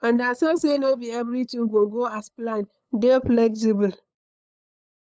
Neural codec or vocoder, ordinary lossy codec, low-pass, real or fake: codec, 16 kHz, 8 kbps, FunCodec, trained on LibriTTS, 25 frames a second; none; none; fake